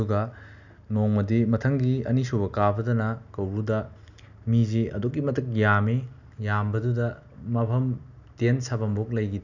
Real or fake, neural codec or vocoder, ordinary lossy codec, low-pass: real; none; none; 7.2 kHz